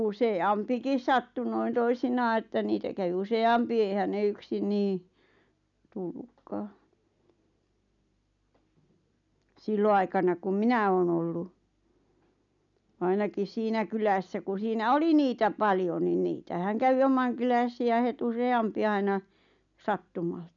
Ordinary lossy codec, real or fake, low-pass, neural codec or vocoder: none; real; 7.2 kHz; none